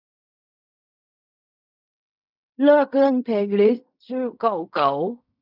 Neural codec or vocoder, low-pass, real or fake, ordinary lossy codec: codec, 16 kHz in and 24 kHz out, 0.4 kbps, LongCat-Audio-Codec, fine tuned four codebook decoder; 5.4 kHz; fake; none